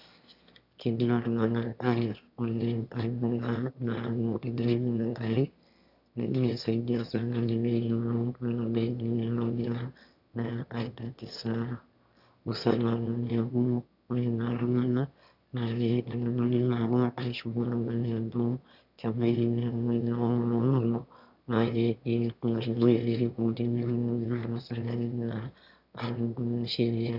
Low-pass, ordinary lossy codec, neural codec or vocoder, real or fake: 5.4 kHz; none; autoencoder, 22.05 kHz, a latent of 192 numbers a frame, VITS, trained on one speaker; fake